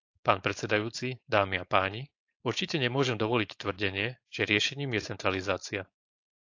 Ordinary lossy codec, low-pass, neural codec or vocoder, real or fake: AAC, 48 kbps; 7.2 kHz; codec, 16 kHz, 4.8 kbps, FACodec; fake